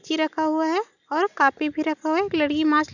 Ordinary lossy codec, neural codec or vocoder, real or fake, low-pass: none; none; real; 7.2 kHz